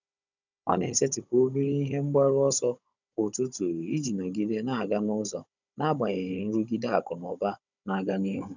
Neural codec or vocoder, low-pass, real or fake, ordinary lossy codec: codec, 16 kHz, 16 kbps, FunCodec, trained on Chinese and English, 50 frames a second; 7.2 kHz; fake; none